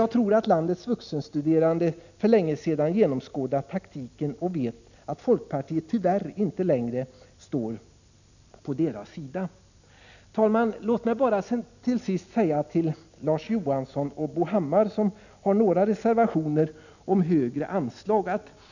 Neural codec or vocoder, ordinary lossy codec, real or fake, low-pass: none; none; real; 7.2 kHz